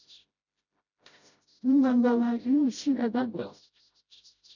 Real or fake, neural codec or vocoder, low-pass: fake; codec, 16 kHz, 0.5 kbps, FreqCodec, smaller model; 7.2 kHz